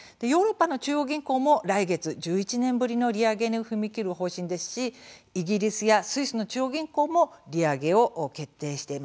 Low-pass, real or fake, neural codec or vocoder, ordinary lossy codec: none; real; none; none